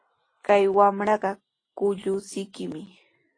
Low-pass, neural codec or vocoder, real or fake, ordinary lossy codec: 9.9 kHz; none; real; AAC, 32 kbps